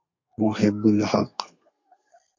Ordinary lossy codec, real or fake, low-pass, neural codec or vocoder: MP3, 48 kbps; fake; 7.2 kHz; codec, 32 kHz, 1.9 kbps, SNAC